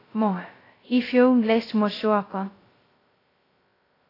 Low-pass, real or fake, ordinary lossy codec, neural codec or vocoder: 5.4 kHz; fake; AAC, 24 kbps; codec, 16 kHz, 0.2 kbps, FocalCodec